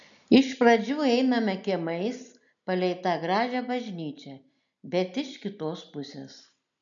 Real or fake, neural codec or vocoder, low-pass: real; none; 7.2 kHz